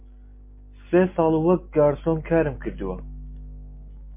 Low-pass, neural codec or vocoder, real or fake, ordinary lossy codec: 3.6 kHz; none; real; MP3, 24 kbps